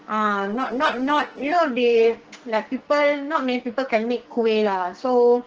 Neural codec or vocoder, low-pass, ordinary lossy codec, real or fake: codec, 44.1 kHz, 2.6 kbps, SNAC; 7.2 kHz; Opus, 16 kbps; fake